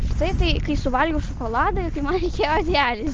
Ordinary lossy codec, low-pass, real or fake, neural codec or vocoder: Opus, 24 kbps; 7.2 kHz; real; none